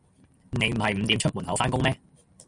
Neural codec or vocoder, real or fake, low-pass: none; real; 10.8 kHz